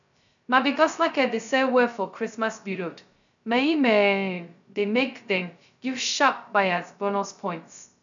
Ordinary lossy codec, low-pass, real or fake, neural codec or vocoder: none; 7.2 kHz; fake; codec, 16 kHz, 0.2 kbps, FocalCodec